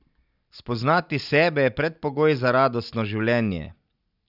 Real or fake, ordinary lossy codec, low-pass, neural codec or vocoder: real; none; 5.4 kHz; none